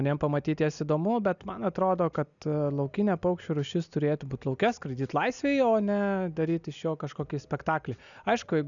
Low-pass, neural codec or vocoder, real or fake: 7.2 kHz; none; real